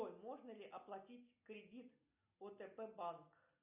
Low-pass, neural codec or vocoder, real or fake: 3.6 kHz; none; real